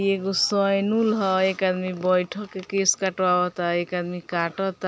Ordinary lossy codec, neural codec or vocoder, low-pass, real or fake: none; none; none; real